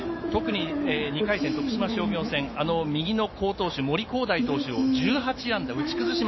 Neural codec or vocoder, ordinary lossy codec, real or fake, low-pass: autoencoder, 48 kHz, 128 numbers a frame, DAC-VAE, trained on Japanese speech; MP3, 24 kbps; fake; 7.2 kHz